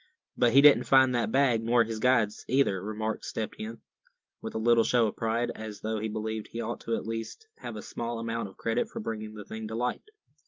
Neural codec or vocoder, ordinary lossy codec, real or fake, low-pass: none; Opus, 32 kbps; real; 7.2 kHz